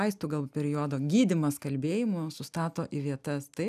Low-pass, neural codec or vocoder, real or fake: 14.4 kHz; none; real